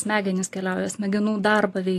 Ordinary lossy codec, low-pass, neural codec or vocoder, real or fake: AAC, 64 kbps; 14.4 kHz; none; real